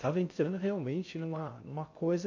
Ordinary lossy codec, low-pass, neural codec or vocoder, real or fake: AAC, 48 kbps; 7.2 kHz; codec, 16 kHz in and 24 kHz out, 0.6 kbps, FocalCodec, streaming, 2048 codes; fake